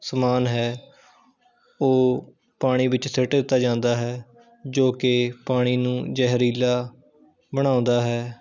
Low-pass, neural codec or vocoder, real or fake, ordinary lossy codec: 7.2 kHz; none; real; none